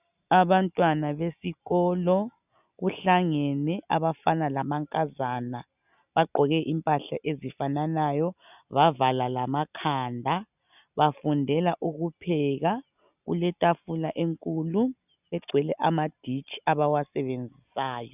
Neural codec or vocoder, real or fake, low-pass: none; real; 3.6 kHz